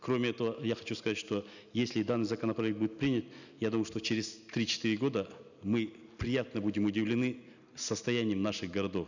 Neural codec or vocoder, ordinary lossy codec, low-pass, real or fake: none; none; 7.2 kHz; real